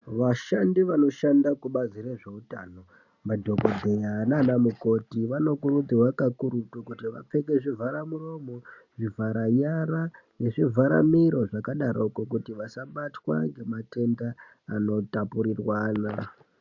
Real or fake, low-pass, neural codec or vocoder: real; 7.2 kHz; none